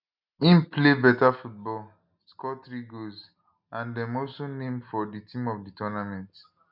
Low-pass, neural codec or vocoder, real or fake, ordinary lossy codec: 5.4 kHz; none; real; none